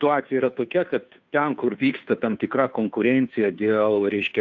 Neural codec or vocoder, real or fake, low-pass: codec, 16 kHz, 2 kbps, FunCodec, trained on Chinese and English, 25 frames a second; fake; 7.2 kHz